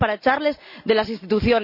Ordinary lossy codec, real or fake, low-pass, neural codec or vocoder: none; real; 5.4 kHz; none